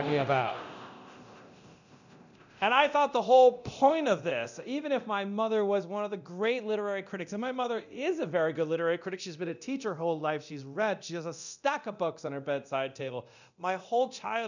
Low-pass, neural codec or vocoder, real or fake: 7.2 kHz; codec, 24 kHz, 0.9 kbps, DualCodec; fake